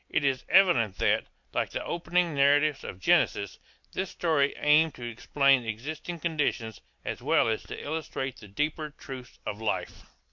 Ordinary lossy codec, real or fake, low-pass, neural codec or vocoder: MP3, 64 kbps; real; 7.2 kHz; none